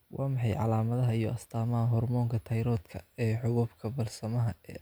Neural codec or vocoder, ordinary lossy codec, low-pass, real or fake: none; none; none; real